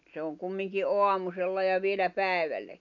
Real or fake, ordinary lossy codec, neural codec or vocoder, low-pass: real; none; none; 7.2 kHz